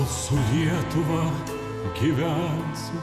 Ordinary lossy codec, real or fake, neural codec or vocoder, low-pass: Opus, 64 kbps; fake; vocoder, 48 kHz, 128 mel bands, Vocos; 14.4 kHz